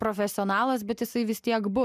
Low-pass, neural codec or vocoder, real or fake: 14.4 kHz; none; real